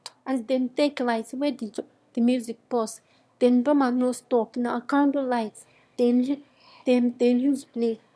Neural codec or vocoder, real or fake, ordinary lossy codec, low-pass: autoencoder, 22.05 kHz, a latent of 192 numbers a frame, VITS, trained on one speaker; fake; none; none